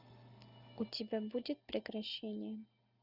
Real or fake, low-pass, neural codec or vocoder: real; 5.4 kHz; none